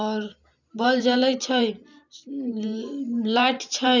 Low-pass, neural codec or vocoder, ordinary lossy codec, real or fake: 7.2 kHz; vocoder, 44.1 kHz, 128 mel bands every 512 samples, BigVGAN v2; none; fake